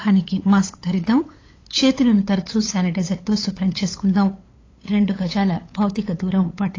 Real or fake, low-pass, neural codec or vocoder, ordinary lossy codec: fake; 7.2 kHz; codec, 16 kHz, 8 kbps, FunCodec, trained on LibriTTS, 25 frames a second; AAC, 32 kbps